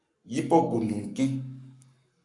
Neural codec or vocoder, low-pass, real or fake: codec, 44.1 kHz, 7.8 kbps, Pupu-Codec; 10.8 kHz; fake